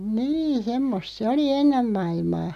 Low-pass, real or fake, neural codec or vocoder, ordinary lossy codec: 14.4 kHz; real; none; none